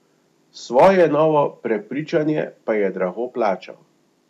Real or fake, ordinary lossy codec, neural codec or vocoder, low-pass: real; none; none; 14.4 kHz